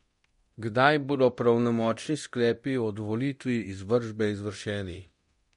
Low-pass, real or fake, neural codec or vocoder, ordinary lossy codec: 10.8 kHz; fake; codec, 24 kHz, 0.9 kbps, DualCodec; MP3, 48 kbps